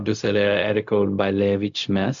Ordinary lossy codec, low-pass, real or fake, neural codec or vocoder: AAC, 64 kbps; 7.2 kHz; fake; codec, 16 kHz, 0.4 kbps, LongCat-Audio-Codec